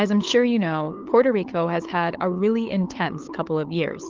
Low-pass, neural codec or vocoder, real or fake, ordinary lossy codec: 7.2 kHz; codec, 16 kHz, 8 kbps, FunCodec, trained on LibriTTS, 25 frames a second; fake; Opus, 16 kbps